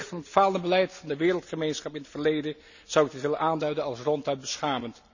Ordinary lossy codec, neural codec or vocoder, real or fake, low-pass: none; none; real; 7.2 kHz